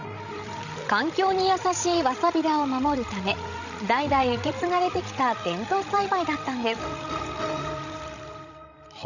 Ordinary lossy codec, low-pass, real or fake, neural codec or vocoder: none; 7.2 kHz; fake; codec, 16 kHz, 16 kbps, FreqCodec, larger model